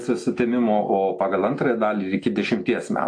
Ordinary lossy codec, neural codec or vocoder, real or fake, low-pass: AAC, 48 kbps; none; real; 9.9 kHz